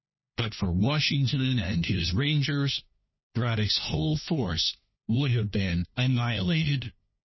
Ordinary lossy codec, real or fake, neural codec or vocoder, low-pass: MP3, 24 kbps; fake; codec, 16 kHz, 1 kbps, FunCodec, trained on LibriTTS, 50 frames a second; 7.2 kHz